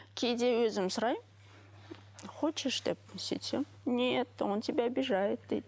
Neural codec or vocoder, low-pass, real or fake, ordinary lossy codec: none; none; real; none